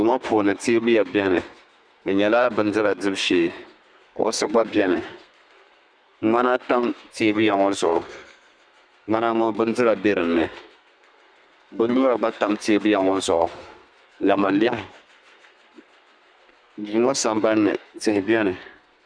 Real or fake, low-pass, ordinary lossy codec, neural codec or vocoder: fake; 9.9 kHz; Opus, 64 kbps; codec, 32 kHz, 1.9 kbps, SNAC